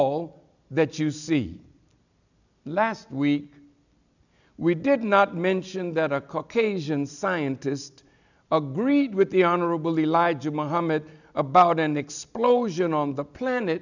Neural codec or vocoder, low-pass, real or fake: none; 7.2 kHz; real